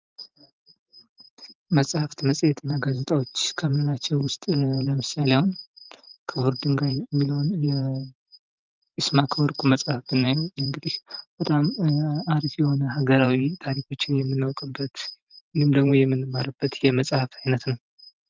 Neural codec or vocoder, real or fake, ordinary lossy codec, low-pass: vocoder, 44.1 kHz, 128 mel bands every 512 samples, BigVGAN v2; fake; Opus, 24 kbps; 7.2 kHz